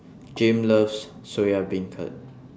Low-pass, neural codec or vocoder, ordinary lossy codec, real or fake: none; none; none; real